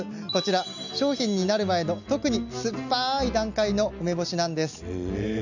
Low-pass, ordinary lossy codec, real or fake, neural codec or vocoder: 7.2 kHz; none; real; none